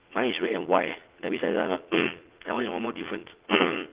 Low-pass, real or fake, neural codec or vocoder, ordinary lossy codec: 3.6 kHz; fake; vocoder, 44.1 kHz, 80 mel bands, Vocos; Opus, 16 kbps